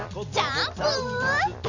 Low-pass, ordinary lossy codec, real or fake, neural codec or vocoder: 7.2 kHz; none; fake; vocoder, 44.1 kHz, 128 mel bands every 256 samples, BigVGAN v2